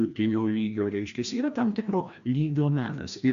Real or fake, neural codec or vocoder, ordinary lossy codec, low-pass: fake; codec, 16 kHz, 1 kbps, FreqCodec, larger model; Opus, 64 kbps; 7.2 kHz